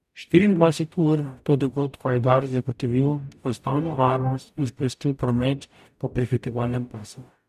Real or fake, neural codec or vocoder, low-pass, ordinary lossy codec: fake; codec, 44.1 kHz, 0.9 kbps, DAC; 14.4 kHz; none